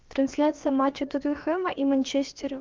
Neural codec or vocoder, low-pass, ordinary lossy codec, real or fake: codec, 16 kHz, about 1 kbps, DyCAST, with the encoder's durations; 7.2 kHz; Opus, 24 kbps; fake